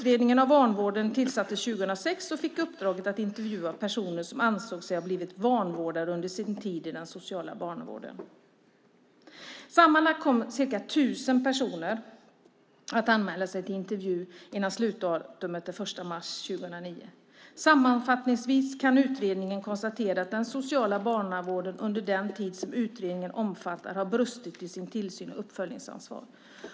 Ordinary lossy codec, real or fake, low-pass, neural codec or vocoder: none; real; none; none